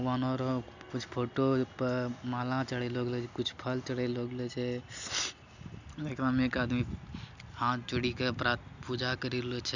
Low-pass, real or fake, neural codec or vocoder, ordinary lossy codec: 7.2 kHz; real; none; none